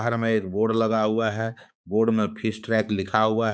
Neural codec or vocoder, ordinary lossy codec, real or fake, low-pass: codec, 16 kHz, 4 kbps, X-Codec, HuBERT features, trained on balanced general audio; none; fake; none